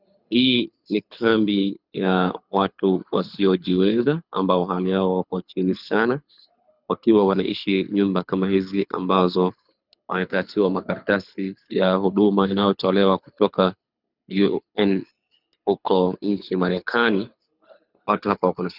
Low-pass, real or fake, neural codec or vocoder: 5.4 kHz; fake; codec, 24 kHz, 6 kbps, HILCodec